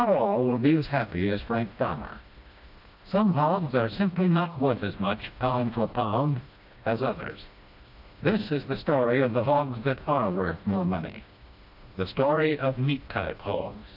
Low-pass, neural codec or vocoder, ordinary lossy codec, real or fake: 5.4 kHz; codec, 16 kHz, 1 kbps, FreqCodec, smaller model; AAC, 48 kbps; fake